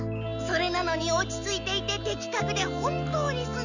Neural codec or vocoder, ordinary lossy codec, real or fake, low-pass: none; none; real; 7.2 kHz